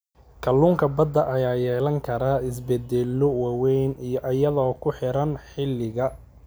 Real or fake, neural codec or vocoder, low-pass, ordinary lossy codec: real; none; none; none